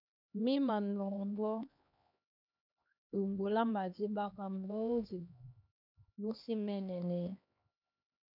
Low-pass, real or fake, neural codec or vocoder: 5.4 kHz; fake; codec, 16 kHz, 2 kbps, X-Codec, HuBERT features, trained on LibriSpeech